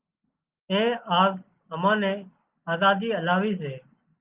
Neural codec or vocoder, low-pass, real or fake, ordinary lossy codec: none; 3.6 kHz; real; Opus, 24 kbps